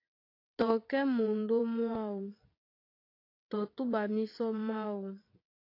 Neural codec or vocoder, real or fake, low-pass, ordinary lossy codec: vocoder, 22.05 kHz, 80 mel bands, WaveNeXt; fake; 5.4 kHz; AAC, 32 kbps